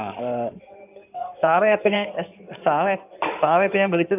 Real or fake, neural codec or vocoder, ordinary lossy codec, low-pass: fake; codec, 16 kHz in and 24 kHz out, 2.2 kbps, FireRedTTS-2 codec; none; 3.6 kHz